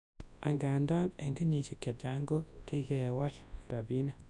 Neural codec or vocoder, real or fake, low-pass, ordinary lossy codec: codec, 24 kHz, 0.9 kbps, WavTokenizer, large speech release; fake; 10.8 kHz; none